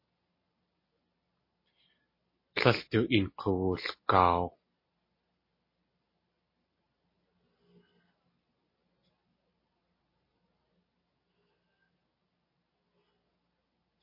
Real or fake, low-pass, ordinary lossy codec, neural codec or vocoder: real; 5.4 kHz; MP3, 24 kbps; none